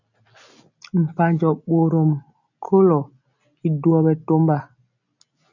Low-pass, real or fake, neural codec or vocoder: 7.2 kHz; real; none